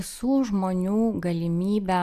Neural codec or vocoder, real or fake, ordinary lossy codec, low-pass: none; real; Opus, 64 kbps; 14.4 kHz